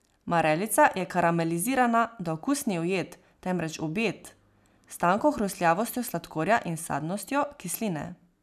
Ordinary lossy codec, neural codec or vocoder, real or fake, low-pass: none; none; real; 14.4 kHz